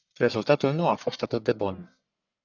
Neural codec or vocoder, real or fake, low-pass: codec, 44.1 kHz, 1.7 kbps, Pupu-Codec; fake; 7.2 kHz